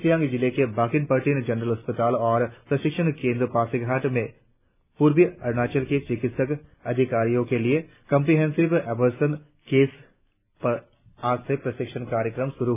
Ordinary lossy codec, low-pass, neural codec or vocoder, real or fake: none; 3.6 kHz; none; real